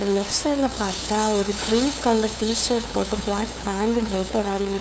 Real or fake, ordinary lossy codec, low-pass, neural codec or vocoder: fake; none; none; codec, 16 kHz, 2 kbps, FunCodec, trained on LibriTTS, 25 frames a second